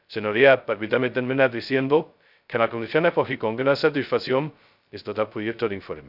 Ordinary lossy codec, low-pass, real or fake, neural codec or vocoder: none; 5.4 kHz; fake; codec, 16 kHz, 0.2 kbps, FocalCodec